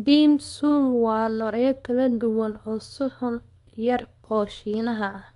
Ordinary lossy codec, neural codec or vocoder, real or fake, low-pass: none; codec, 24 kHz, 0.9 kbps, WavTokenizer, small release; fake; 10.8 kHz